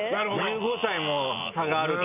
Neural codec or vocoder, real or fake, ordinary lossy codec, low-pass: none; real; none; 3.6 kHz